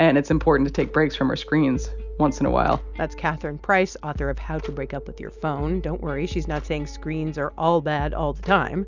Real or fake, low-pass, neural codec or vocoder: real; 7.2 kHz; none